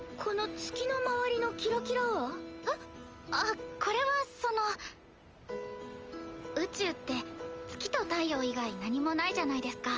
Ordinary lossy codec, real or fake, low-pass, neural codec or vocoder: Opus, 24 kbps; real; 7.2 kHz; none